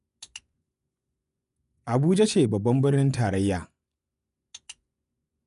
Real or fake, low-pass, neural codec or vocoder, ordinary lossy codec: fake; 10.8 kHz; vocoder, 24 kHz, 100 mel bands, Vocos; none